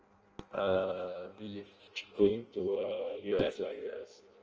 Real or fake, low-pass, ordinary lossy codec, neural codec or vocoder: fake; 7.2 kHz; Opus, 24 kbps; codec, 16 kHz in and 24 kHz out, 0.6 kbps, FireRedTTS-2 codec